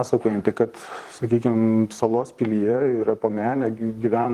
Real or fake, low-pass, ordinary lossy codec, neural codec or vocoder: fake; 14.4 kHz; Opus, 24 kbps; vocoder, 44.1 kHz, 128 mel bands, Pupu-Vocoder